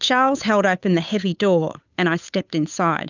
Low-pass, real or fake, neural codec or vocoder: 7.2 kHz; fake; codec, 16 kHz, 8 kbps, FunCodec, trained on Chinese and English, 25 frames a second